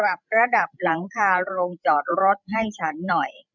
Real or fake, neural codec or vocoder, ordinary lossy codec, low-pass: fake; codec, 16 kHz, 16 kbps, FreqCodec, larger model; none; 7.2 kHz